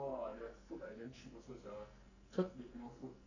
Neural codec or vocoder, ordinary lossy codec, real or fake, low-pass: codec, 44.1 kHz, 2.6 kbps, SNAC; none; fake; 7.2 kHz